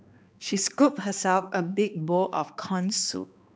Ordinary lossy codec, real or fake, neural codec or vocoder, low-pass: none; fake; codec, 16 kHz, 2 kbps, X-Codec, HuBERT features, trained on balanced general audio; none